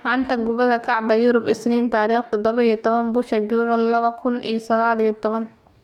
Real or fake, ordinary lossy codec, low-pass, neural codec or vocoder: fake; none; 19.8 kHz; codec, 44.1 kHz, 2.6 kbps, DAC